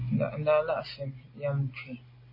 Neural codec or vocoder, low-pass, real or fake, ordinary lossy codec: none; 5.4 kHz; real; MP3, 24 kbps